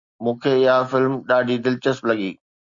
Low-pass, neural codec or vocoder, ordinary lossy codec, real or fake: 7.2 kHz; none; Opus, 64 kbps; real